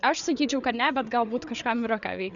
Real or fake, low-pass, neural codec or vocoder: fake; 7.2 kHz; codec, 16 kHz, 8 kbps, FreqCodec, larger model